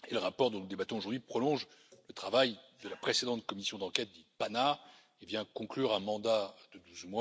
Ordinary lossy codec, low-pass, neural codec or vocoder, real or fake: none; none; none; real